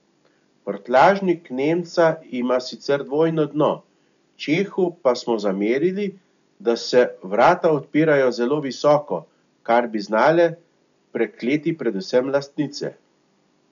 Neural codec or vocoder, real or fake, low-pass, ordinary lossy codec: none; real; 7.2 kHz; none